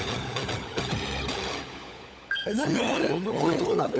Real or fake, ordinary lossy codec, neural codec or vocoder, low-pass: fake; none; codec, 16 kHz, 16 kbps, FunCodec, trained on LibriTTS, 50 frames a second; none